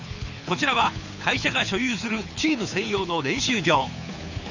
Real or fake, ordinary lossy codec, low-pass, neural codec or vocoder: fake; none; 7.2 kHz; codec, 24 kHz, 6 kbps, HILCodec